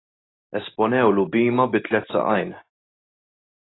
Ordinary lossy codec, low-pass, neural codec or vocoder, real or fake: AAC, 16 kbps; 7.2 kHz; none; real